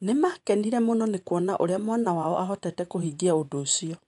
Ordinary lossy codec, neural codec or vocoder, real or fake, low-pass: none; vocoder, 24 kHz, 100 mel bands, Vocos; fake; 10.8 kHz